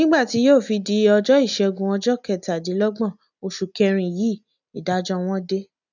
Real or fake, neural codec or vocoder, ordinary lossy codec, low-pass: real; none; none; 7.2 kHz